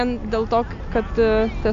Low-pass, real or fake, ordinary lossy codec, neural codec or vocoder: 7.2 kHz; real; AAC, 64 kbps; none